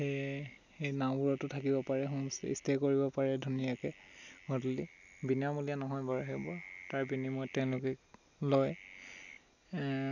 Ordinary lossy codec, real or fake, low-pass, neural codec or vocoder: none; real; 7.2 kHz; none